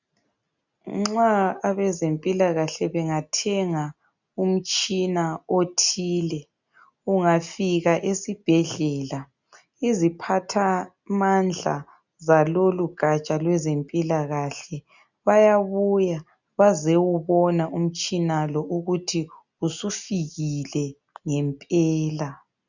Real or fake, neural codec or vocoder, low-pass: real; none; 7.2 kHz